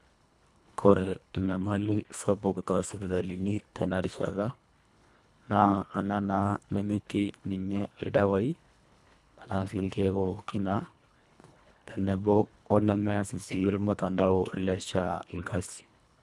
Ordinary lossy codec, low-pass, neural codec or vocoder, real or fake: none; none; codec, 24 kHz, 1.5 kbps, HILCodec; fake